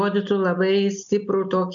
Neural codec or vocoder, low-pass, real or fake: none; 7.2 kHz; real